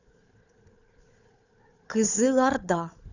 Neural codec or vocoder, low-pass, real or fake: codec, 16 kHz, 4 kbps, FunCodec, trained on Chinese and English, 50 frames a second; 7.2 kHz; fake